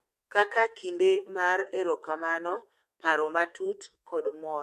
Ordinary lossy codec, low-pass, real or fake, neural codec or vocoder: MP3, 64 kbps; 14.4 kHz; fake; codec, 32 kHz, 1.9 kbps, SNAC